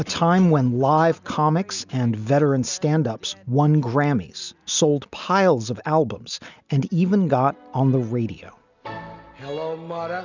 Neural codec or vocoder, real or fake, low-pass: none; real; 7.2 kHz